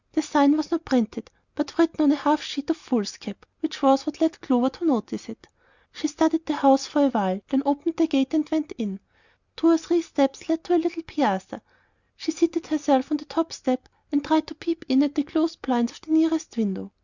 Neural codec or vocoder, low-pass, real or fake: none; 7.2 kHz; real